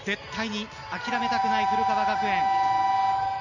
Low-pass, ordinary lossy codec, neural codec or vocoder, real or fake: 7.2 kHz; none; none; real